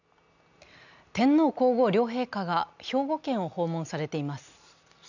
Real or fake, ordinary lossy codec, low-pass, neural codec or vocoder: real; none; 7.2 kHz; none